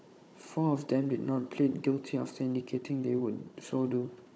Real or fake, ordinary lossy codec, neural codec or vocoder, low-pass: fake; none; codec, 16 kHz, 16 kbps, FunCodec, trained on Chinese and English, 50 frames a second; none